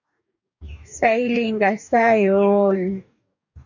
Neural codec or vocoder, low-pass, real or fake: codec, 44.1 kHz, 2.6 kbps, DAC; 7.2 kHz; fake